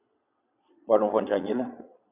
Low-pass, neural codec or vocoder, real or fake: 3.6 kHz; vocoder, 22.05 kHz, 80 mel bands, Vocos; fake